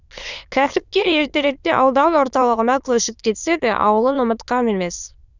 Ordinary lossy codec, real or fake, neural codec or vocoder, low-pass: Opus, 64 kbps; fake; autoencoder, 22.05 kHz, a latent of 192 numbers a frame, VITS, trained on many speakers; 7.2 kHz